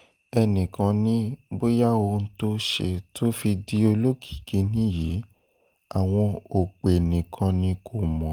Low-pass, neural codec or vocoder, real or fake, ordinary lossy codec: 19.8 kHz; none; real; Opus, 24 kbps